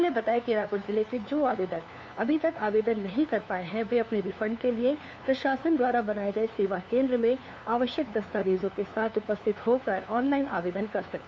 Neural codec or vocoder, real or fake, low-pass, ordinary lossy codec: codec, 16 kHz, 2 kbps, FunCodec, trained on LibriTTS, 25 frames a second; fake; none; none